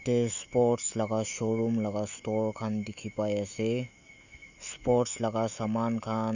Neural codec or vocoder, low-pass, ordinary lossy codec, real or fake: autoencoder, 48 kHz, 128 numbers a frame, DAC-VAE, trained on Japanese speech; 7.2 kHz; none; fake